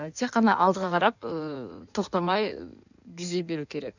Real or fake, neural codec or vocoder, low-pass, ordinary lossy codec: fake; codec, 16 kHz in and 24 kHz out, 1.1 kbps, FireRedTTS-2 codec; 7.2 kHz; none